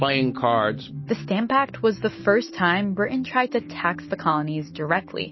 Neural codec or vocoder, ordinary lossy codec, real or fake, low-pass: none; MP3, 24 kbps; real; 7.2 kHz